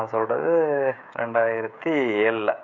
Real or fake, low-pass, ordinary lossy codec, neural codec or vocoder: fake; 7.2 kHz; none; codec, 16 kHz, 16 kbps, FreqCodec, smaller model